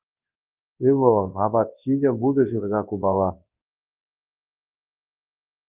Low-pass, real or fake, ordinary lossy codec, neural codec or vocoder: 3.6 kHz; fake; Opus, 32 kbps; codec, 24 kHz, 0.9 kbps, WavTokenizer, large speech release